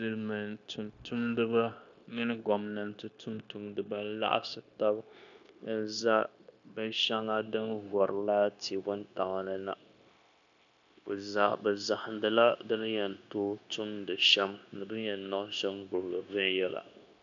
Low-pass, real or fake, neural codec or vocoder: 7.2 kHz; fake; codec, 16 kHz, 0.9 kbps, LongCat-Audio-Codec